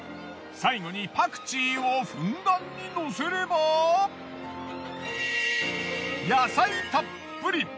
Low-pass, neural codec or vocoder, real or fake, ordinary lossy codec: none; none; real; none